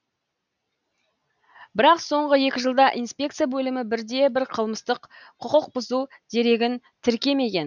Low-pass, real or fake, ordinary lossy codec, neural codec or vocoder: 7.2 kHz; real; none; none